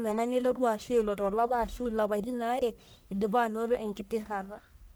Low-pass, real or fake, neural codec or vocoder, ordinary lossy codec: none; fake; codec, 44.1 kHz, 1.7 kbps, Pupu-Codec; none